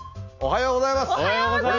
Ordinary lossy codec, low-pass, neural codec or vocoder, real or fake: none; 7.2 kHz; none; real